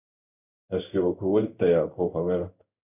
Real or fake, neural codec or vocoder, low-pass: fake; codec, 16 kHz in and 24 kHz out, 1 kbps, XY-Tokenizer; 3.6 kHz